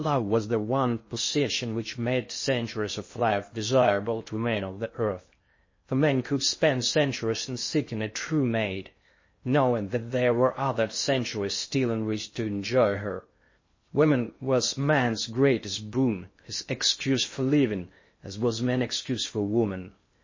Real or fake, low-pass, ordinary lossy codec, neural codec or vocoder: fake; 7.2 kHz; MP3, 32 kbps; codec, 16 kHz in and 24 kHz out, 0.6 kbps, FocalCodec, streaming, 2048 codes